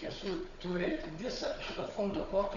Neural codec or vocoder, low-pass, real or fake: codec, 16 kHz, 4 kbps, FunCodec, trained on Chinese and English, 50 frames a second; 7.2 kHz; fake